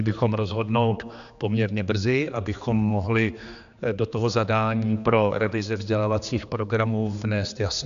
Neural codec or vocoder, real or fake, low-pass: codec, 16 kHz, 2 kbps, X-Codec, HuBERT features, trained on general audio; fake; 7.2 kHz